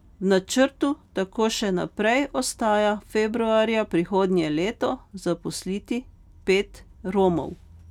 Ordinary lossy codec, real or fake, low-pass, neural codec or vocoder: none; real; 19.8 kHz; none